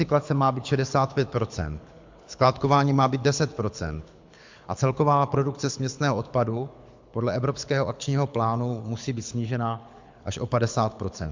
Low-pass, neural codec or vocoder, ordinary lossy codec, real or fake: 7.2 kHz; codec, 24 kHz, 6 kbps, HILCodec; MP3, 64 kbps; fake